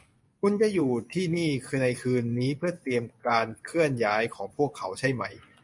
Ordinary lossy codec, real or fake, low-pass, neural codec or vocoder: MP3, 64 kbps; fake; 10.8 kHz; vocoder, 24 kHz, 100 mel bands, Vocos